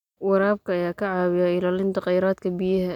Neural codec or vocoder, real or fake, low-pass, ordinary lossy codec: none; real; 19.8 kHz; none